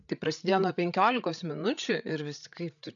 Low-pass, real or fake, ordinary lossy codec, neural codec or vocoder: 7.2 kHz; fake; MP3, 96 kbps; codec, 16 kHz, 8 kbps, FreqCodec, larger model